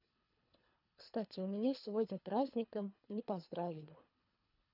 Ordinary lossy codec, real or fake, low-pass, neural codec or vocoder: none; fake; 5.4 kHz; codec, 24 kHz, 3 kbps, HILCodec